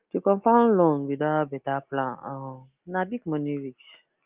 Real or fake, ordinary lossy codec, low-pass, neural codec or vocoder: real; Opus, 24 kbps; 3.6 kHz; none